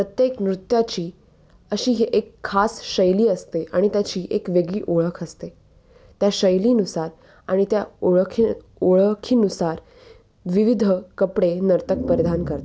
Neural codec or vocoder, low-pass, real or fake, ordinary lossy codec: none; none; real; none